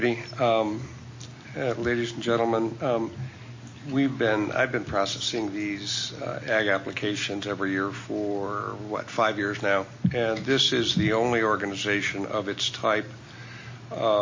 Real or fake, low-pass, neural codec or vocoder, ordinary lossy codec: real; 7.2 kHz; none; MP3, 32 kbps